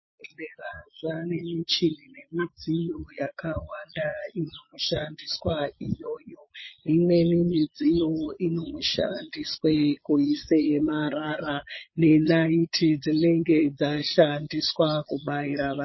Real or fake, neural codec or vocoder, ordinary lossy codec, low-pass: fake; vocoder, 22.05 kHz, 80 mel bands, Vocos; MP3, 24 kbps; 7.2 kHz